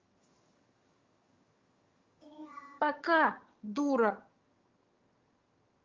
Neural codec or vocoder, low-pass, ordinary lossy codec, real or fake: vocoder, 22.05 kHz, 80 mel bands, HiFi-GAN; 7.2 kHz; Opus, 32 kbps; fake